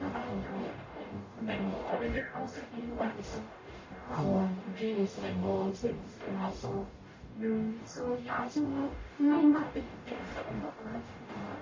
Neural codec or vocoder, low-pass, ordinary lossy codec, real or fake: codec, 44.1 kHz, 0.9 kbps, DAC; 7.2 kHz; MP3, 32 kbps; fake